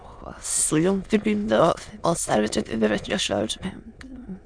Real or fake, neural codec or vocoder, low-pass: fake; autoencoder, 22.05 kHz, a latent of 192 numbers a frame, VITS, trained on many speakers; 9.9 kHz